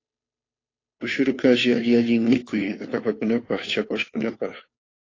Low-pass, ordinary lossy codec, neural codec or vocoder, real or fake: 7.2 kHz; AAC, 32 kbps; codec, 16 kHz, 2 kbps, FunCodec, trained on Chinese and English, 25 frames a second; fake